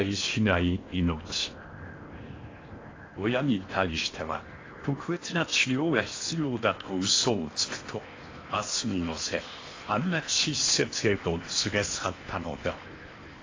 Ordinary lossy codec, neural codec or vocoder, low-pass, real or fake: AAC, 32 kbps; codec, 16 kHz in and 24 kHz out, 0.8 kbps, FocalCodec, streaming, 65536 codes; 7.2 kHz; fake